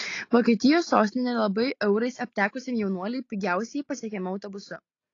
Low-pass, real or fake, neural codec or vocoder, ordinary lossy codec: 7.2 kHz; real; none; AAC, 32 kbps